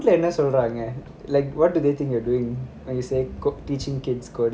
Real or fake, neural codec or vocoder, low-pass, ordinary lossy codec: real; none; none; none